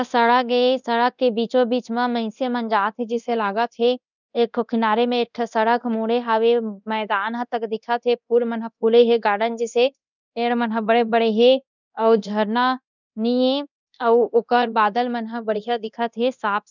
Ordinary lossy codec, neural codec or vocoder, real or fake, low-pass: none; codec, 24 kHz, 0.9 kbps, DualCodec; fake; 7.2 kHz